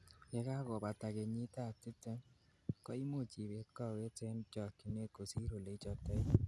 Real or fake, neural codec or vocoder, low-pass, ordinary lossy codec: real; none; none; none